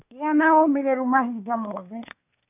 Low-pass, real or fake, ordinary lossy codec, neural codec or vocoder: 3.6 kHz; fake; none; codec, 24 kHz, 1 kbps, SNAC